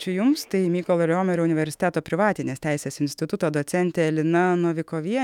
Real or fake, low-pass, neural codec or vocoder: fake; 19.8 kHz; autoencoder, 48 kHz, 128 numbers a frame, DAC-VAE, trained on Japanese speech